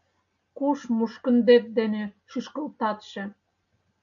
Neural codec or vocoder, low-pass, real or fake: none; 7.2 kHz; real